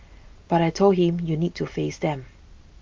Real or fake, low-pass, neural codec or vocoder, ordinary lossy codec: real; 7.2 kHz; none; Opus, 32 kbps